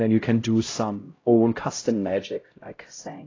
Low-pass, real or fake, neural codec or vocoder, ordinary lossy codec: 7.2 kHz; fake; codec, 16 kHz, 0.5 kbps, X-Codec, HuBERT features, trained on LibriSpeech; AAC, 32 kbps